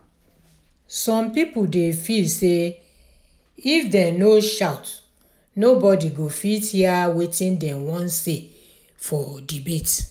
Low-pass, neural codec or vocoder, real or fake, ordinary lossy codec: none; none; real; none